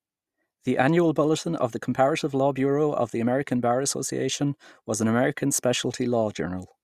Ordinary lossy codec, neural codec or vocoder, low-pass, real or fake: Opus, 64 kbps; none; 14.4 kHz; real